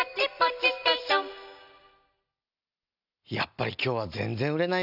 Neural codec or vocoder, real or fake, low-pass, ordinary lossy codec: none; real; 5.4 kHz; none